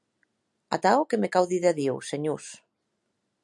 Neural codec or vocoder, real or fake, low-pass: none; real; 10.8 kHz